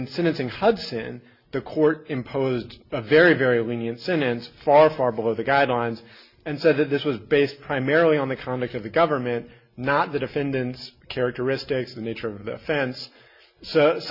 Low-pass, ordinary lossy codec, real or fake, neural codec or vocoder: 5.4 kHz; Opus, 64 kbps; real; none